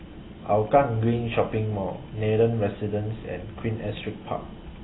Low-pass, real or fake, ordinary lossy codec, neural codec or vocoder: 7.2 kHz; real; AAC, 16 kbps; none